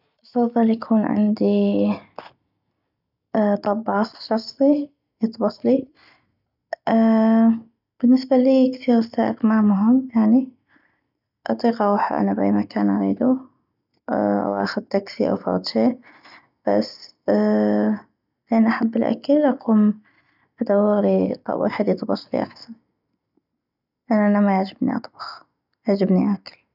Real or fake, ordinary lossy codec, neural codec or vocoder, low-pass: real; none; none; 5.4 kHz